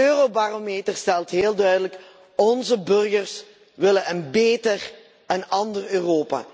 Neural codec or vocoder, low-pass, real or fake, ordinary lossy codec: none; none; real; none